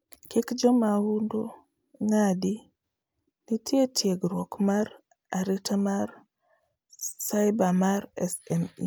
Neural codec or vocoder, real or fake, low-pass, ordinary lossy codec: none; real; none; none